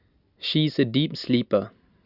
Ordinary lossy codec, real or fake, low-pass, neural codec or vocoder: Opus, 64 kbps; real; 5.4 kHz; none